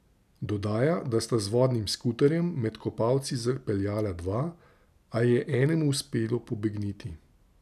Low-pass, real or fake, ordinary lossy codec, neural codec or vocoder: 14.4 kHz; real; none; none